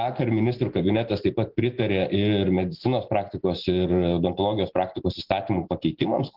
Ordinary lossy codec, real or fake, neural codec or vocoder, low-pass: Opus, 16 kbps; real; none; 5.4 kHz